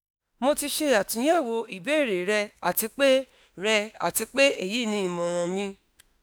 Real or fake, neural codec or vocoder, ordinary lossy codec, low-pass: fake; autoencoder, 48 kHz, 32 numbers a frame, DAC-VAE, trained on Japanese speech; none; none